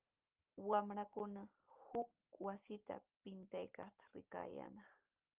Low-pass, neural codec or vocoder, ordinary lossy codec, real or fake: 3.6 kHz; none; Opus, 24 kbps; real